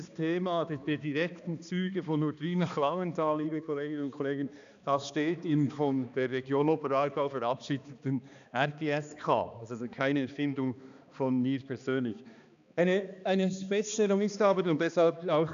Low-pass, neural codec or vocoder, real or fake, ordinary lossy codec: 7.2 kHz; codec, 16 kHz, 2 kbps, X-Codec, HuBERT features, trained on balanced general audio; fake; none